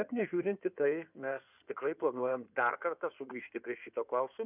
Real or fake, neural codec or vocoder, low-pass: fake; codec, 16 kHz in and 24 kHz out, 2.2 kbps, FireRedTTS-2 codec; 3.6 kHz